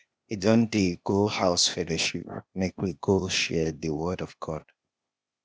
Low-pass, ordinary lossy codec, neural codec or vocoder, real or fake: none; none; codec, 16 kHz, 0.8 kbps, ZipCodec; fake